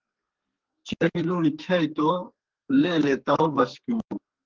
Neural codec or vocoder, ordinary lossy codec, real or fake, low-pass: codec, 32 kHz, 1.9 kbps, SNAC; Opus, 16 kbps; fake; 7.2 kHz